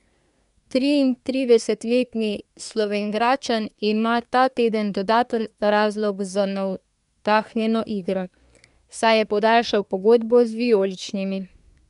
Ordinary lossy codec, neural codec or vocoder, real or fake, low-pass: none; codec, 24 kHz, 1 kbps, SNAC; fake; 10.8 kHz